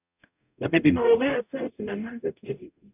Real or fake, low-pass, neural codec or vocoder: fake; 3.6 kHz; codec, 44.1 kHz, 0.9 kbps, DAC